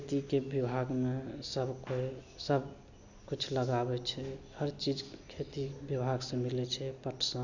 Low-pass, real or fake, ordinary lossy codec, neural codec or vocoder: 7.2 kHz; real; none; none